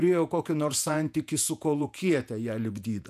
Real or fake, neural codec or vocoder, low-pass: fake; vocoder, 48 kHz, 128 mel bands, Vocos; 14.4 kHz